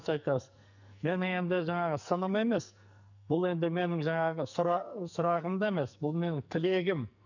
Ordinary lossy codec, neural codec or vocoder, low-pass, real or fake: none; codec, 44.1 kHz, 2.6 kbps, SNAC; 7.2 kHz; fake